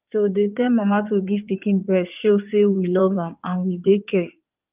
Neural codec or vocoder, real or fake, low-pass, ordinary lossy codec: codec, 16 kHz in and 24 kHz out, 2.2 kbps, FireRedTTS-2 codec; fake; 3.6 kHz; Opus, 32 kbps